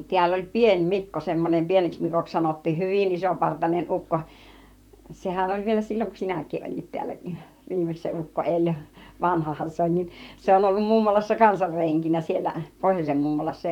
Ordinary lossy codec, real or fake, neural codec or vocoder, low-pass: none; fake; vocoder, 44.1 kHz, 128 mel bands, Pupu-Vocoder; 19.8 kHz